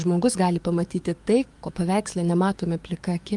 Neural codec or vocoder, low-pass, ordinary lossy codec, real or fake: codec, 44.1 kHz, 7.8 kbps, DAC; 10.8 kHz; Opus, 32 kbps; fake